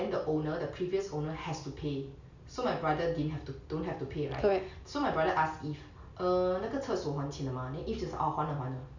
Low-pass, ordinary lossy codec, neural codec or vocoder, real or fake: 7.2 kHz; none; none; real